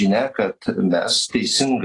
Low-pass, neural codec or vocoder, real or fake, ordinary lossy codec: 10.8 kHz; none; real; AAC, 32 kbps